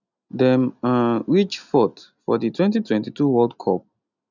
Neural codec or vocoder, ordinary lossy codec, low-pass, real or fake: none; none; 7.2 kHz; real